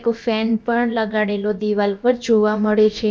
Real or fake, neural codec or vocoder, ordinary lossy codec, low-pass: fake; codec, 16 kHz, about 1 kbps, DyCAST, with the encoder's durations; none; none